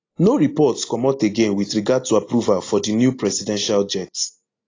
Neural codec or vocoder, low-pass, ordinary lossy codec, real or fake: none; 7.2 kHz; AAC, 32 kbps; real